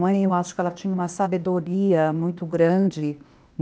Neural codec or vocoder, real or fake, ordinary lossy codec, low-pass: codec, 16 kHz, 0.8 kbps, ZipCodec; fake; none; none